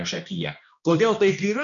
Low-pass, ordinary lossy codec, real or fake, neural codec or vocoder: 7.2 kHz; MP3, 96 kbps; fake; codec, 16 kHz, 1 kbps, X-Codec, HuBERT features, trained on balanced general audio